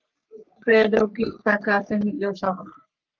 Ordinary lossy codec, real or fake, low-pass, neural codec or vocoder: Opus, 24 kbps; fake; 7.2 kHz; codec, 44.1 kHz, 3.4 kbps, Pupu-Codec